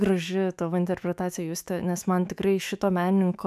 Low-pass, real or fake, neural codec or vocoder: 14.4 kHz; fake; autoencoder, 48 kHz, 128 numbers a frame, DAC-VAE, trained on Japanese speech